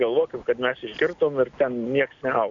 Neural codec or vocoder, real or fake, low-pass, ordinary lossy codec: none; real; 7.2 kHz; MP3, 96 kbps